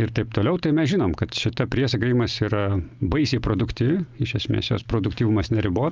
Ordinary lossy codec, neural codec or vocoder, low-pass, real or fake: Opus, 32 kbps; none; 7.2 kHz; real